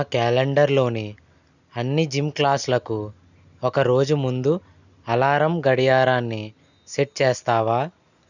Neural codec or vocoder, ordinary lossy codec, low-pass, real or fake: none; none; 7.2 kHz; real